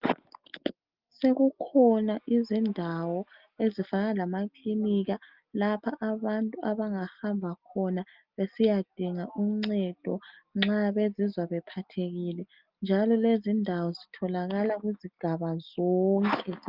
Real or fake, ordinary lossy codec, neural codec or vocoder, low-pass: real; Opus, 32 kbps; none; 5.4 kHz